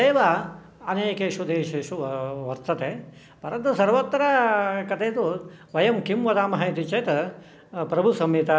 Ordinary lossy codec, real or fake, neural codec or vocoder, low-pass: none; real; none; none